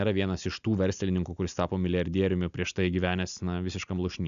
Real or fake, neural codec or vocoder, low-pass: real; none; 7.2 kHz